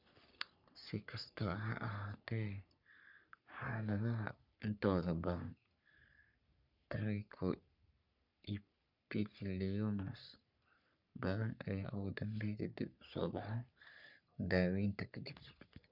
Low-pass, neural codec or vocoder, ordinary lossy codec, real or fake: 5.4 kHz; codec, 44.1 kHz, 3.4 kbps, Pupu-Codec; none; fake